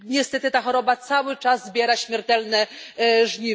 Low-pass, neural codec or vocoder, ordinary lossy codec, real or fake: none; none; none; real